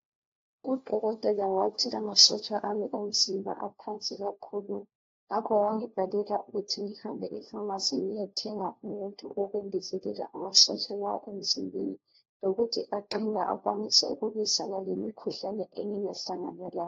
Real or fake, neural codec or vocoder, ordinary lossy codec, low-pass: fake; codec, 16 kHz, 1 kbps, FunCodec, trained on LibriTTS, 50 frames a second; AAC, 32 kbps; 7.2 kHz